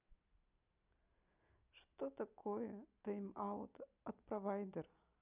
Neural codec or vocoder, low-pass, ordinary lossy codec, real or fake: none; 3.6 kHz; none; real